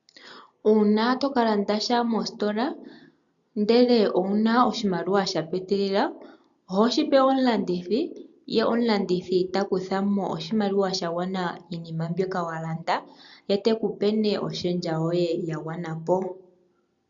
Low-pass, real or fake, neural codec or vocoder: 7.2 kHz; real; none